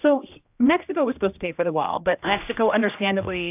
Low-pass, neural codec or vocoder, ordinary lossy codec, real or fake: 3.6 kHz; codec, 16 kHz, 1 kbps, X-Codec, HuBERT features, trained on general audio; AAC, 32 kbps; fake